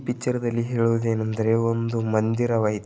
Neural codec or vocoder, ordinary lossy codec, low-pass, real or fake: none; none; none; real